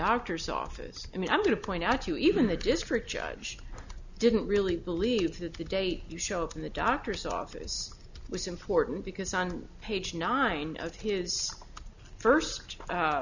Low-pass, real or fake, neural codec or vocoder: 7.2 kHz; real; none